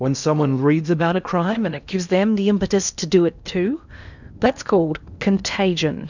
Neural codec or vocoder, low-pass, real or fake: codec, 16 kHz in and 24 kHz out, 0.8 kbps, FocalCodec, streaming, 65536 codes; 7.2 kHz; fake